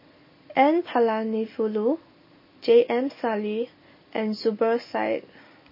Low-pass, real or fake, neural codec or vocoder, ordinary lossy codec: 5.4 kHz; real; none; MP3, 24 kbps